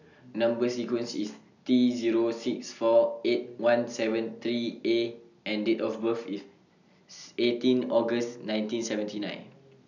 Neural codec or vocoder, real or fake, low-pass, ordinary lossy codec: none; real; 7.2 kHz; none